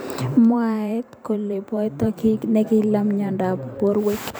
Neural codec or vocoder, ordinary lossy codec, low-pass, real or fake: vocoder, 44.1 kHz, 128 mel bands every 512 samples, BigVGAN v2; none; none; fake